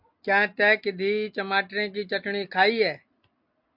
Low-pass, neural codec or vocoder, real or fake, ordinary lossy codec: 5.4 kHz; none; real; MP3, 48 kbps